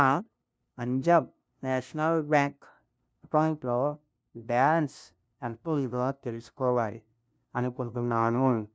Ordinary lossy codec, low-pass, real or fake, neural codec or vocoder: none; none; fake; codec, 16 kHz, 0.5 kbps, FunCodec, trained on LibriTTS, 25 frames a second